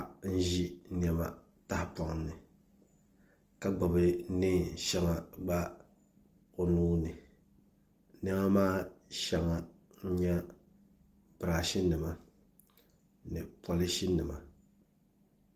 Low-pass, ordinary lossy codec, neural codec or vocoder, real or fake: 14.4 kHz; Opus, 24 kbps; none; real